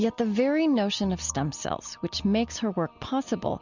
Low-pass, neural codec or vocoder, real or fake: 7.2 kHz; none; real